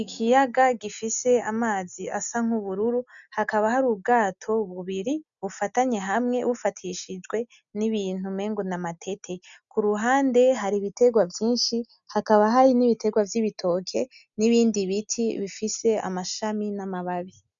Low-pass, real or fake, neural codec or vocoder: 7.2 kHz; real; none